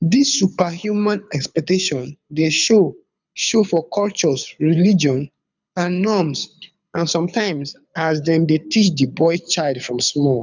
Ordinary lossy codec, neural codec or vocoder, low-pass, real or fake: none; codec, 24 kHz, 6 kbps, HILCodec; 7.2 kHz; fake